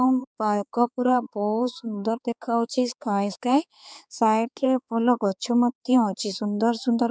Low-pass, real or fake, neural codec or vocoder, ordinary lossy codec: none; fake; codec, 16 kHz, 4 kbps, X-Codec, HuBERT features, trained on balanced general audio; none